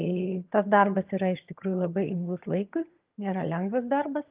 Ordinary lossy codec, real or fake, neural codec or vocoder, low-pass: Opus, 32 kbps; fake; vocoder, 22.05 kHz, 80 mel bands, HiFi-GAN; 3.6 kHz